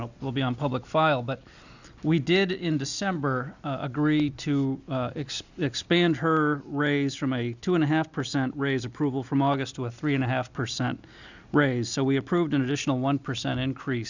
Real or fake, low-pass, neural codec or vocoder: real; 7.2 kHz; none